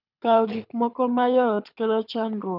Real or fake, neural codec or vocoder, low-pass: fake; codec, 24 kHz, 6 kbps, HILCodec; 5.4 kHz